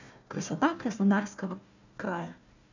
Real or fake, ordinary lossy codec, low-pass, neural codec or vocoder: fake; none; 7.2 kHz; codec, 16 kHz, 1 kbps, FunCodec, trained on Chinese and English, 50 frames a second